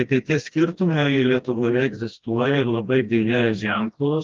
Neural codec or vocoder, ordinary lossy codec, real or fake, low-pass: codec, 16 kHz, 1 kbps, FreqCodec, smaller model; Opus, 32 kbps; fake; 7.2 kHz